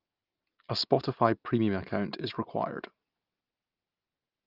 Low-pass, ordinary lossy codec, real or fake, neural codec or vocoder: 5.4 kHz; Opus, 24 kbps; real; none